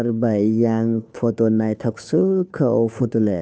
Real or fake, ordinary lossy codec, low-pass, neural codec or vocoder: fake; none; none; codec, 16 kHz, 2 kbps, FunCodec, trained on Chinese and English, 25 frames a second